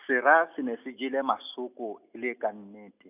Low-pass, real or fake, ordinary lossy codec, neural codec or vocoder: 3.6 kHz; real; none; none